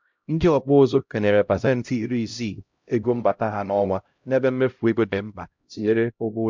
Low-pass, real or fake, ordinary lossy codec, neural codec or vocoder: 7.2 kHz; fake; MP3, 64 kbps; codec, 16 kHz, 0.5 kbps, X-Codec, HuBERT features, trained on LibriSpeech